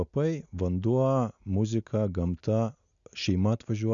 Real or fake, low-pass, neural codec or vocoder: real; 7.2 kHz; none